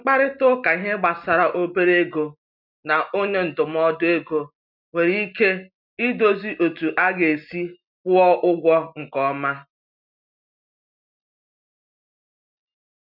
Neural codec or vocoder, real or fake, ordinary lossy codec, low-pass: none; real; none; 5.4 kHz